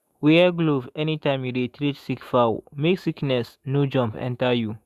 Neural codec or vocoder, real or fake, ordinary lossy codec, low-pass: autoencoder, 48 kHz, 128 numbers a frame, DAC-VAE, trained on Japanese speech; fake; Opus, 64 kbps; 14.4 kHz